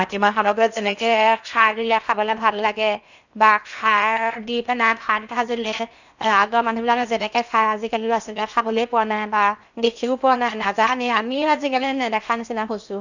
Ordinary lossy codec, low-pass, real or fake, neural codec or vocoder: none; 7.2 kHz; fake; codec, 16 kHz in and 24 kHz out, 0.8 kbps, FocalCodec, streaming, 65536 codes